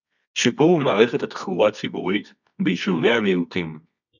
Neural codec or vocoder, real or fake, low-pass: codec, 24 kHz, 0.9 kbps, WavTokenizer, medium music audio release; fake; 7.2 kHz